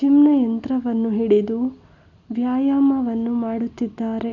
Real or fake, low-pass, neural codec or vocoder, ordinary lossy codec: real; 7.2 kHz; none; none